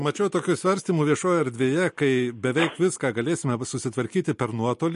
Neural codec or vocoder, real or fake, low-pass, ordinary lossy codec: none; real; 10.8 kHz; MP3, 48 kbps